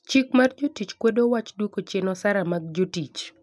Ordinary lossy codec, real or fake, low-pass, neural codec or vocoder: none; real; none; none